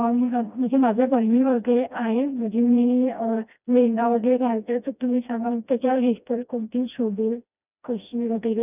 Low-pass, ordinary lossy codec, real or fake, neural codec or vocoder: 3.6 kHz; none; fake; codec, 16 kHz, 1 kbps, FreqCodec, smaller model